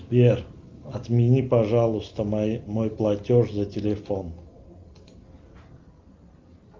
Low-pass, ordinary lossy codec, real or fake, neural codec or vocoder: 7.2 kHz; Opus, 24 kbps; real; none